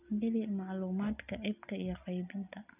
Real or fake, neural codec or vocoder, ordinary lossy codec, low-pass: real; none; none; 3.6 kHz